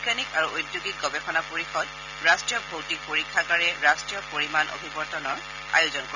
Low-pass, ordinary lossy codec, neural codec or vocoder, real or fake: 7.2 kHz; none; none; real